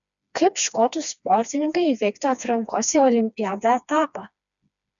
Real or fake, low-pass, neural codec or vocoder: fake; 7.2 kHz; codec, 16 kHz, 2 kbps, FreqCodec, smaller model